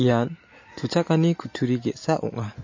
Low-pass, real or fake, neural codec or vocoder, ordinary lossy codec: 7.2 kHz; real; none; MP3, 32 kbps